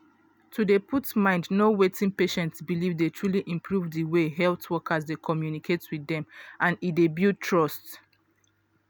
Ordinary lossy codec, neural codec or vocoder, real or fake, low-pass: none; none; real; none